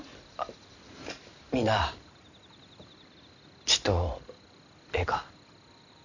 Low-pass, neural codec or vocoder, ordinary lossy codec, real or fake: 7.2 kHz; none; none; real